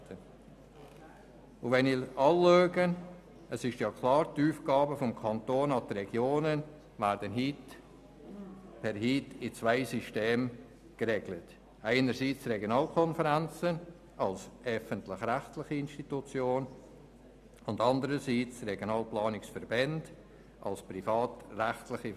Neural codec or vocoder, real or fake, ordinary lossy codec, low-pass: none; real; none; 14.4 kHz